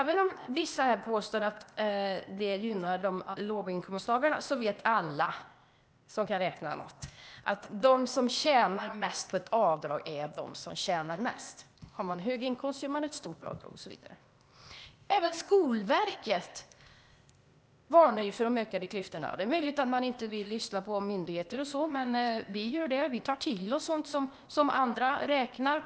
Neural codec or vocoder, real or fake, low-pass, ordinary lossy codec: codec, 16 kHz, 0.8 kbps, ZipCodec; fake; none; none